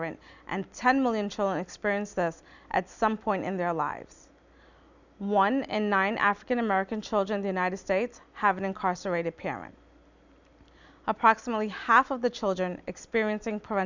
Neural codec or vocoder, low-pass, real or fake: none; 7.2 kHz; real